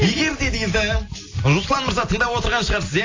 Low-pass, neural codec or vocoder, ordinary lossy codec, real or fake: 7.2 kHz; none; AAC, 32 kbps; real